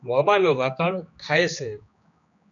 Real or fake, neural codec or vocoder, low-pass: fake; codec, 16 kHz, 2 kbps, X-Codec, HuBERT features, trained on general audio; 7.2 kHz